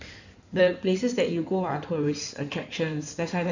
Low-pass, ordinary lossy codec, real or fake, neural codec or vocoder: 7.2 kHz; none; fake; codec, 16 kHz in and 24 kHz out, 2.2 kbps, FireRedTTS-2 codec